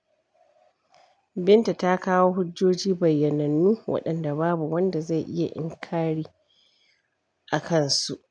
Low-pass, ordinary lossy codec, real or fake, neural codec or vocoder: 9.9 kHz; none; real; none